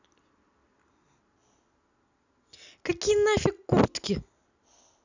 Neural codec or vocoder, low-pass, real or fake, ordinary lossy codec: none; 7.2 kHz; real; none